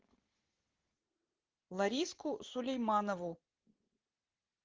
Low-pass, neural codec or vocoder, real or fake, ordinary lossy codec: 7.2 kHz; none; real; Opus, 16 kbps